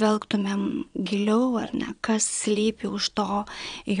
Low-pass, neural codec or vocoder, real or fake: 9.9 kHz; vocoder, 22.05 kHz, 80 mel bands, Vocos; fake